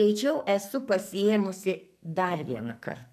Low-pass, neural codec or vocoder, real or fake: 14.4 kHz; codec, 44.1 kHz, 2.6 kbps, SNAC; fake